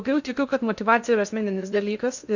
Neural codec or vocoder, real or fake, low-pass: codec, 16 kHz in and 24 kHz out, 0.6 kbps, FocalCodec, streaming, 2048 codes; fake; 7.2 kHz